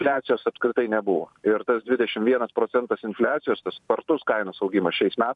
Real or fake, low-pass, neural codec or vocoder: fake; 10.8 kHz; vocoder, 44.1 kHz, 128 mel bands every 512 samples, BigVGAN v2